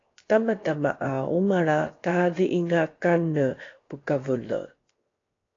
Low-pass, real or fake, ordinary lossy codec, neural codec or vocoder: 7.2 kHz; fake; AAC, 32 kbps; codec, 16 kHz, 0.7 kbps, FocalCodec